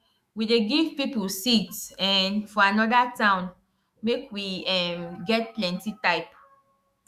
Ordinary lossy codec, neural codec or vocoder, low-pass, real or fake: Opus, 64 kbps; autoencoder, 48 kHz, 128 numbers a frame, DAC-VAE, trained on Japanese speech; 14.4 kHz; fake